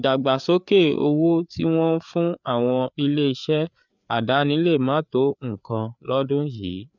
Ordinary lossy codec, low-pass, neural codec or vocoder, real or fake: none; 7.2 kHz; codec, 16 kHz, 4 kbps, FreqCodec, larger model; fake